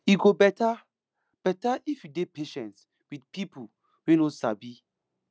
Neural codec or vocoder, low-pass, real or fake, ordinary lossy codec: none; none; real; none